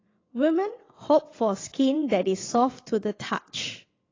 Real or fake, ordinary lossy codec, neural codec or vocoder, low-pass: fake; AAC, 32 kbps; vocoder, 22.05 kHz, 80 mel bands, WaveNeXt; 7.2 kHz